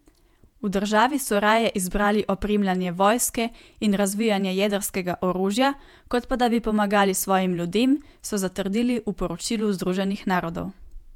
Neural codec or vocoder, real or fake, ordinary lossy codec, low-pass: vocoder, 48 kHz, 128 mel bands, Vocos; fake; MP3, 96 kbps; 19.8 kHz